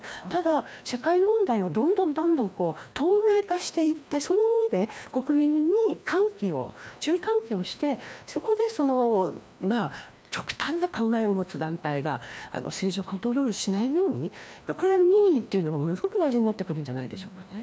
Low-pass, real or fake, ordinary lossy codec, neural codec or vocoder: none; fake; none; codec, 16 kHz, 1 kbps, FreqCodec, larger model